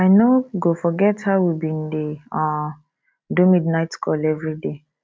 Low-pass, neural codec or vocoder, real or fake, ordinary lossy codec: none; none; real; none